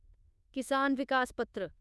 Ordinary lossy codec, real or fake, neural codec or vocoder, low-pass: none; fake; codec, 24 kHz, 1.2 kbps, DualCodec; none